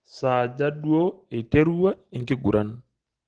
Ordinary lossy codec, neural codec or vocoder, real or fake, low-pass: Opus, 16 kbps; none; real; 9.9 kHz